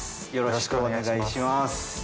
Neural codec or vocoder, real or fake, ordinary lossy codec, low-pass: none; real; none; none